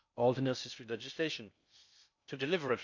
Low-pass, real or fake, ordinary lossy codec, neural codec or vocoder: 7.2 kHz; fake; none; codec, 16 kHz in and 24 kHz out, 0.6 kbps, FocalCodec, streaming, 2048 codes